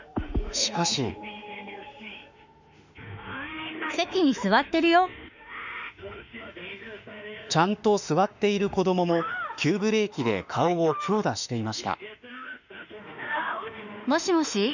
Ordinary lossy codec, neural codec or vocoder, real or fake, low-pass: none; autoencoder, 48 kHz, 32 numbers a frame, DAC-VAE, trained on Japanese speech; fake; 7.2 kHz